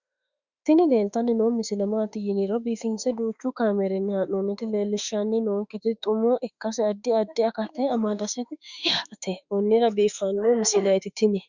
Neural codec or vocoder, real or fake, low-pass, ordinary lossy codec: autoencoder, 48 kHz, 32 numbers a frame, DAC-VAE, trained on Japanese speech; fake; 7.2 kHz; Opus, 64 kbps